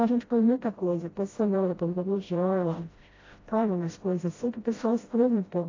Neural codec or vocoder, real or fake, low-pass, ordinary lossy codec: codec, 16 kHz, 0.5 kbps, FreqCodec, smaller model; fake; 7.2 kHz; AAC, 32 kbps